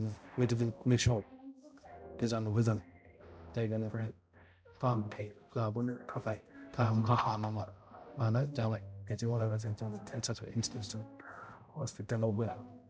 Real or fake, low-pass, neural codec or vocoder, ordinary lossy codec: fake; none; codec, 16 kHz, 0.5 kbps, X-Codec, HuBERT features, trained on balanced general audio; none